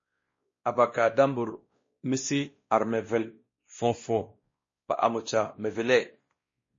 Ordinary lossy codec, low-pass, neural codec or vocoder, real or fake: MP3, 32 kbps; 7.2 kHz; codec, 16 kHz, 1 kbps, X-Codec, WavLM features, trained on Multilingual LibriSpeech; fake